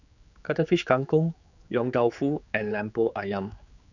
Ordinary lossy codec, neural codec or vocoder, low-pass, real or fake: none; codec, 16 kHz, 4 kbps, X-Codec, HuBERT features, trained on general audio; 7.2 kHz; fake